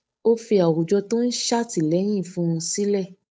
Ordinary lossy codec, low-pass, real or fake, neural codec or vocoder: none; none; fake; codec, 16 kHz, 8 kbps, FunCodec, trained on Chinese and English, 25 frames a second